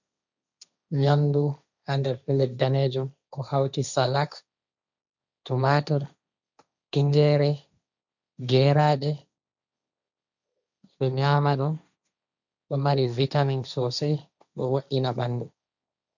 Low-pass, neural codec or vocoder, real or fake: 7.2 kHz; codec, 16 kHz, 1.1 kbps, Voila-Tokenizer; fake